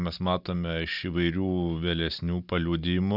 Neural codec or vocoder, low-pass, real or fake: none; 5.4 kHz; real